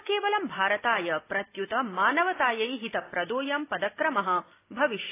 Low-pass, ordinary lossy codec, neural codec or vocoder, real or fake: 3.6 kHz; AAC, 24 kbps; none; real